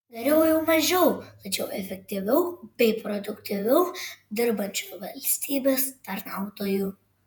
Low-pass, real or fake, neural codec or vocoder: 19.8 kHz; fake; vocoder, 48 kHz, 128 mel bands, Vocos